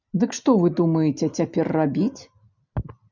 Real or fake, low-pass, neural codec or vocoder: real; 7.2 kHz; none